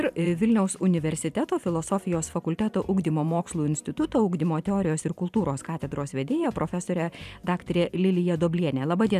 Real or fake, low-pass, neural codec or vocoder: fake; 14.4 kHz; vocoder, 44.1 kHz, 128 mel bands every 512 samples, BigVGAN v2